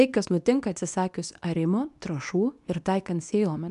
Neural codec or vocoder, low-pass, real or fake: codec, 24 kHz, 0.9 kbps, WavTokenizer, medium speech release version 2; 10.8 kHz; fake